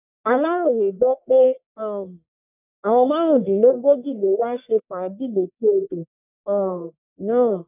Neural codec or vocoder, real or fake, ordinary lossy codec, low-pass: codec, 44.1 kHz, 1.7 kbps, Pupu-Codec; fake; none; 3.6 kHz